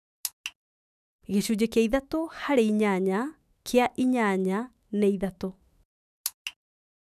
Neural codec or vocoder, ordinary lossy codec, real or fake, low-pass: autoencoder, 48 kHz, 128 numbers a frame, DAC-VAE, trained on Japanese speech; none; fake; 14.4 kHz